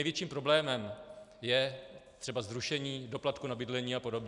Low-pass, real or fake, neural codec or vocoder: 10.8 kHz; real; none